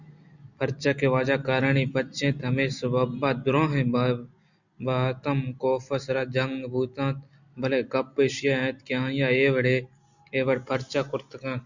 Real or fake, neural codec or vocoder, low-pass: real; none; 7.2 kHz